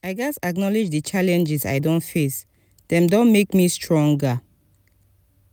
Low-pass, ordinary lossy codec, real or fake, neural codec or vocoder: none; none; real; none